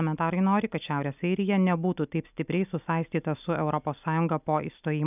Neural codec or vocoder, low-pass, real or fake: none; 3.6 kHz; real